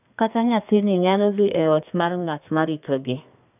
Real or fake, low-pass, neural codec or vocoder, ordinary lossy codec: fake; 3.6 kHz; codec, 32 kHz, 1.9 kbps, SNAC; none